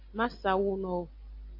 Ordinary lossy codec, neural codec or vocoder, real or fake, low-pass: AAC, 32 kbps; none; real; 5.4 kHz